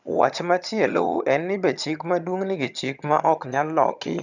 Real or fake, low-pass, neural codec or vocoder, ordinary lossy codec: fake; 7.2 kHz; vocoder, 22.05 kHz, 80 mel bands, HiFi-GAN; none